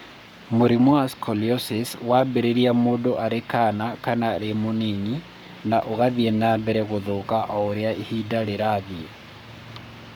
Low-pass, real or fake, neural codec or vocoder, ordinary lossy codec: none; fake; codec, 44.1 kHz, 7.8 kbps, Pupu-Codec; none